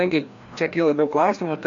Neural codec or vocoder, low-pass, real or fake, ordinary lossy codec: codec, 16 kHz, 1 kbps, FreqCodec, larger model; 7.2 kHz; fake; AAC, 48 kbps